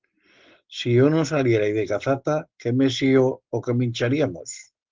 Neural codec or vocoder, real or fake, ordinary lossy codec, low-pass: none; real; Opus, 16 kbps; 7.2 kHz